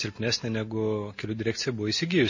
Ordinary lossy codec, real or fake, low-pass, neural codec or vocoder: MP3, 32 kbps; real; 7.2 kHz; none